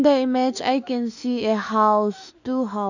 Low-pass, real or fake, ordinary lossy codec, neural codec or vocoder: 7.2 kHz; fake; none; autoencoder, 48 kHz, 32 numbers a frame, DAC-VAE, trained on Japanese speech